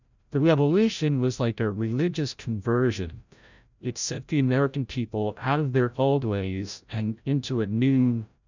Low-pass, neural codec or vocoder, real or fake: 7.2 kHz; codec, 16 kHz, 0.5 kbps, FreqCodec, larger model; fake